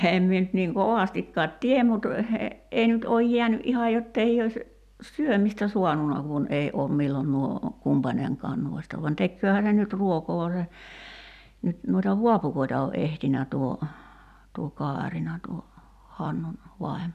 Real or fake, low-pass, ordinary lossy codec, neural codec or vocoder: real; 14.4 kHz; none; none